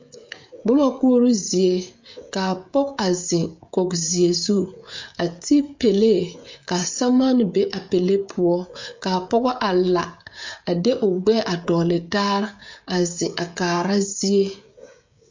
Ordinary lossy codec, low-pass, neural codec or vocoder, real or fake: MP3, 48 kbps; 7.2 kHz; codec, 16 kHz, 16 kbps, FreqCodec, smaller model; fake